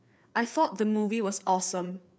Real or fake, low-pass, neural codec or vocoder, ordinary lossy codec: fake; none; codec, 16 kHz, 4 kbps, FreqCodec, larger model; none